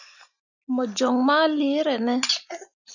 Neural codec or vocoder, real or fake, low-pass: none; real; 7.2 kHz